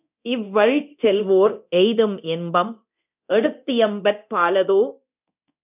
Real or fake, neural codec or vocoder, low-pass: fake; codec, 24 kHz, 0.9 kbps, DualCodec; 3.6 kHz